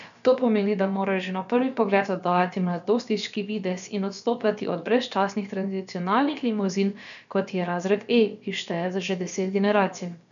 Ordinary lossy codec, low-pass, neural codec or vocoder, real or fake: none; 7.2 kHz; codec, 16 kHz, about 1 kbps, DyCAST, with the encoder's durations; fake